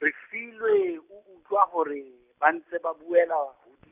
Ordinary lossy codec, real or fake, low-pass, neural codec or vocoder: none; real; 3.6 kHz; none